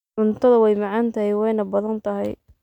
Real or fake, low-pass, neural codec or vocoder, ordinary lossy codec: real; 19.8 kHz; none; none